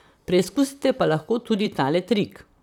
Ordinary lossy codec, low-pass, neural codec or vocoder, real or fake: none; 19.8 kHz; vocoder, 44.1 kHz, 128 mel bands, Pupu-Vocoder; fake